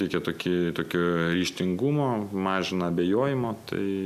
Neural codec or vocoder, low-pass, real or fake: none; 14.4 kHz; real